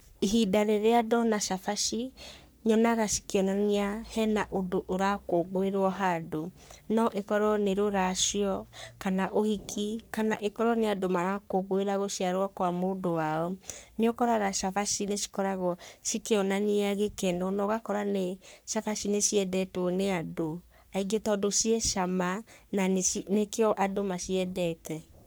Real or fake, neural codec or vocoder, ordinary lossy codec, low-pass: fake; codec, 44.1 kHz, 3.4 kbps, Pupu-Codec; none; none